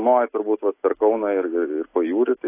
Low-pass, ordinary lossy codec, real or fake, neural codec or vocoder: 3.6 kHz; AAC, 24 kbps; real; none